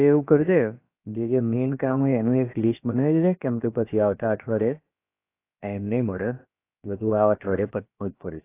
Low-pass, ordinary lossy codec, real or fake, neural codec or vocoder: 3.6 kHz; AAC, 24 kbps; fake; codec, 16 kHz, about 1 kbps, DyCAST, with the encoder's durations